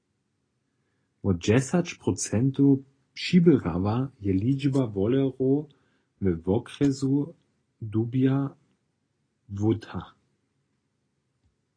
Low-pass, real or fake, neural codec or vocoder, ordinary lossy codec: 9.9 kHz; real; none; AAC, 32 kbps